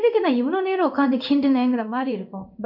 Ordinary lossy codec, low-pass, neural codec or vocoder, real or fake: none; 5.4 kHz; codec, 16 kHz in and 24 kHz out, 1 kbps, XY-Tokenizer; fake